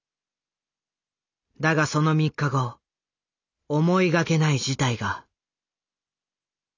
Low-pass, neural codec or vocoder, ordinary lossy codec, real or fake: 7.2 kHz; none; none; real